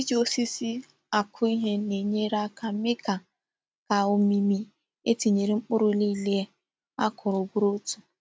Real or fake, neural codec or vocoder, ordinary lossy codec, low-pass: real; none; none; none